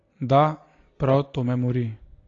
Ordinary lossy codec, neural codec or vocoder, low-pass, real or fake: AAC, 32 kbps; none; 7.2 kHz; real